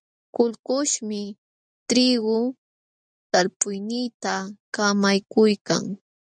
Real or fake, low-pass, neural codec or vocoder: real; 9.9 kHz; none